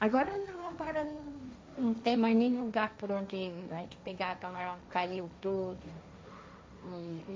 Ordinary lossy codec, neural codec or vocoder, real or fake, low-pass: none; codec, 16 kHz, 1.1 kbps, Voila-Tokenizer; fake; none